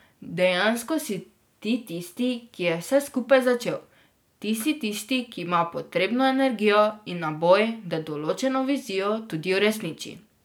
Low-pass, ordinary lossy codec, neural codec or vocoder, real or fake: none; none; none; real